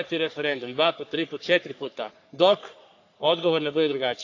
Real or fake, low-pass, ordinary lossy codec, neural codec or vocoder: fake; 7.2 kHz; AAC, 48 kbps; codec, 44.1 kHz, 3.4 kbps, Pupu-Codec